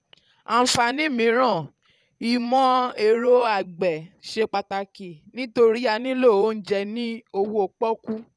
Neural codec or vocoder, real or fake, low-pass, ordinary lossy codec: vocoder, 22.05 kHz, 80 mel bands, Vocos; fake; none; none